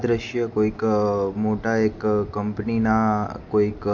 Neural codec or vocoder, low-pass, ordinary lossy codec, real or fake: none; 7.2 kHz; MP3, 48 kbps; real